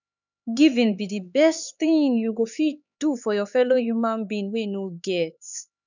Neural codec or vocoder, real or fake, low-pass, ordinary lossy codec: codec, 16 kHz, 4 kbps, X-Codec, HuBERT features, trained on LibriSpeech; fake; 7.2 kHz; none